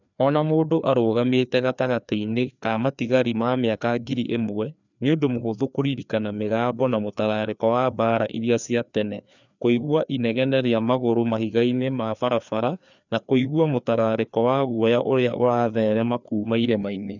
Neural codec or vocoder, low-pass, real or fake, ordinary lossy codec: codec, 16 kHz, 2 kbps, FreqCodec, larger model; 7.2 kHz; fake; none